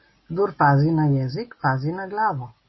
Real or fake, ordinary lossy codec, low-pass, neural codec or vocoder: real; MP3, 24 kbps; 7.2 kHz; none